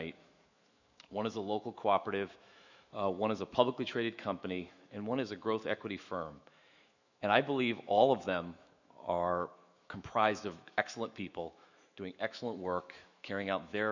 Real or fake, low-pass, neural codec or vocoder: fake; 7.2 kHz; autoencoder, 48 kHz, 128 numbers a frame, DAC-VAE, trained on Japanese speech